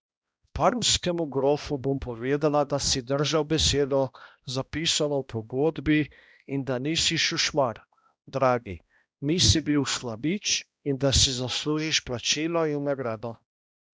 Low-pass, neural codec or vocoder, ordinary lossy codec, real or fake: none; codec, 16 kHz, 1 kbps, X-Codec, HuBERT features, trained on balanced general audio; none; fake